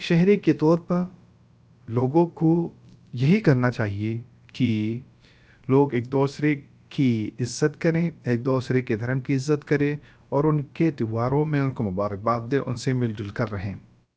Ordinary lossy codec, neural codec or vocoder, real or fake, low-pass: none; codec, 16 kHz, about 1 kbps, DyCAST, with the encoder's durations; fake; none